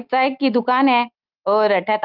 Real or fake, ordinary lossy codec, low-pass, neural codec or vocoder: real; Opus, 32 kbps; 5.4 kHz; none